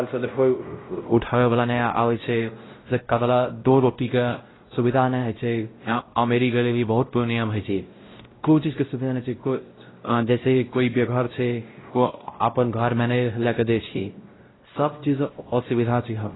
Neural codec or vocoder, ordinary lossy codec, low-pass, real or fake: codec, 16 kHz, 0.5 kbps, X-Codec, WavLM features, trained on Multilingual LibriSpeech; AAC, 16 kbps; 7.2 kHz; fake